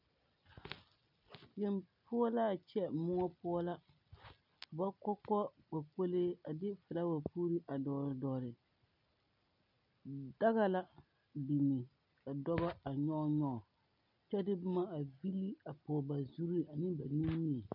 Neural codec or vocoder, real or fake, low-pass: none; real; 5.4 kHz